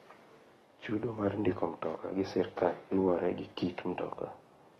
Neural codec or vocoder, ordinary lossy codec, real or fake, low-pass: codec, 44.1 kHz, 7.8 kbps, DAC; AAC, 32 kbps; fake; 19.8 kHz